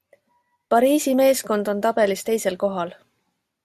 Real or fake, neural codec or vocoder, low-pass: real; none; 14.4 kHz